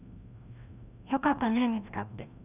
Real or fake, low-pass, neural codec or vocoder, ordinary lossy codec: fake; 3.6 kHz; codec, 16 kHz, 1 kbps, FreqCodec, larger model; none